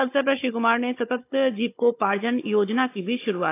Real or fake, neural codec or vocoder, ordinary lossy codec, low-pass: fake; codec, 16 kHz, 16 kbps, FunCodec, trained on Chinese and English, 50 frames a second; AAC, 24 kbps; 3.6 kHz